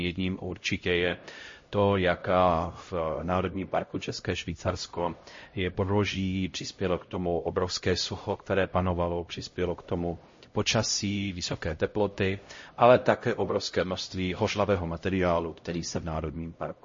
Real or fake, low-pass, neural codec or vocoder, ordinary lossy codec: fake; 7.2 kHz; codec, 16 kHz, 0.5 kbps, X-Codec, HuBERT features, trained on LibriSpeech; MP3, 32 kbps